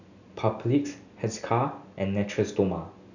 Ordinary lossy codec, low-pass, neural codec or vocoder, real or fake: none; 7.2 kHz; none; real